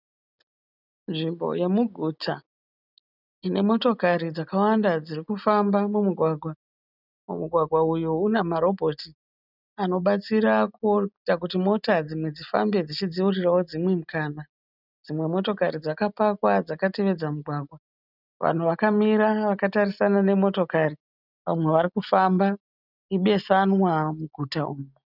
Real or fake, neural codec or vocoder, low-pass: real; none; 5.4 kHz